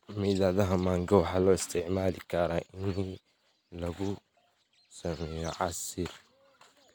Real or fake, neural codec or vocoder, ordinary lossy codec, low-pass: real; none; none; none